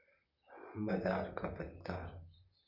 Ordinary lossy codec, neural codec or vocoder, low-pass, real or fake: none; vocoder, 44.1 kHz, 128 mel bands, Pupu-Vocoder; 5.4 kHz; fake